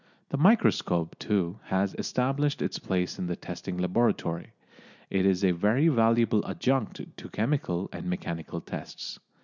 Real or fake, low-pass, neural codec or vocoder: real; 7.2 kHz; none